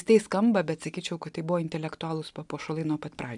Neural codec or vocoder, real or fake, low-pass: none; real; 10.8 kHz